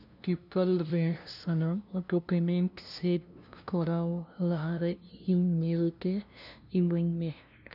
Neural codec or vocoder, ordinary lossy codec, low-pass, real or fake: codec, 16 kHz, 0.5 kbps, FunCodec, trained on LibriTTS, 25 frames a second; none; 5.4 kHz; fake